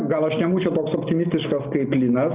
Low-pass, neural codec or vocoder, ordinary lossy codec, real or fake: 3.6 kHz; none; Opus, 24 kbps; real